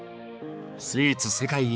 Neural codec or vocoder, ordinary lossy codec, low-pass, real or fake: codec, 16 kHz, 4 kbps, X-Codec, HuBERT features, trained on balanced general audio; none; none; fake